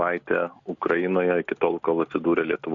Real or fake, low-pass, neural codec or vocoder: real; 7.2 kHz; none